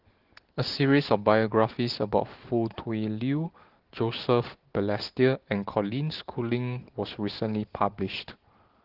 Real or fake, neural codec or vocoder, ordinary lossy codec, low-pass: real; none; Opus, 16 kbps; 5.4 kHz